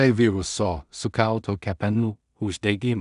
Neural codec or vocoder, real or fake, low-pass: codec, 16 kHz in and 24 kHz out, 0.4 kbps, LongCat-Audio-Codec, two codebook decoder; fake; 10.8 kHz